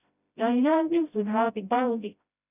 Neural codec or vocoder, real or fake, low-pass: codec, 16 kHz, 0.5 kbps, FreqCodec, smaller model; fake; 3.6 kHz